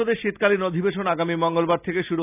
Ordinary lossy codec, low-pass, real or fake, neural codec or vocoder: none; 3.6 kHz; real; none